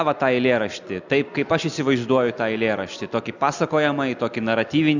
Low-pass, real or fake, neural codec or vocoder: 7.2 kHz; real; none